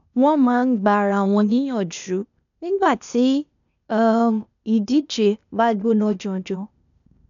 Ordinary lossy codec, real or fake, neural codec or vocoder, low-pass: none; fake; codec, 16 kHz, 0.8 kbps, ZipCodec; 7.2 kHz